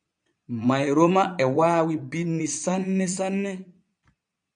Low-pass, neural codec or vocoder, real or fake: 9.9 kHz; vocoder, 22.05 kHz, 80 mel bands, Vocos; fake